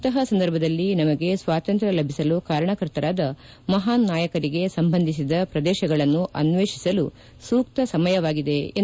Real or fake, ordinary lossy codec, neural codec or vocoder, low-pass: real; none; none; none